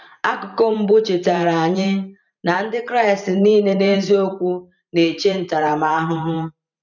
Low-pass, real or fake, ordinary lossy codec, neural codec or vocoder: 7.2 kHz; fake; none; vocoder, 44.1 kHz, 128 mel bands every 512 samples, BigVGAN v2